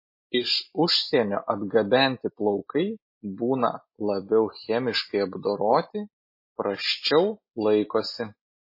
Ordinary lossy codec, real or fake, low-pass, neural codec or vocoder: MP3, 24 kbps; real; 5.4 kHz; none